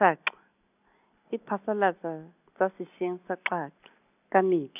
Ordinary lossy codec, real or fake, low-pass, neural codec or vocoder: none; real; 3.6 kHz; none